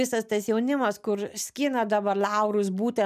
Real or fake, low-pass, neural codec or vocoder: real; 14.4 kHz; none